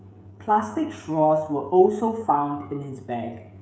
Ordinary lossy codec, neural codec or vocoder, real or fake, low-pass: none; codec, 16 kHz, 16 kbps, FreqCodec, smaller model; fake; none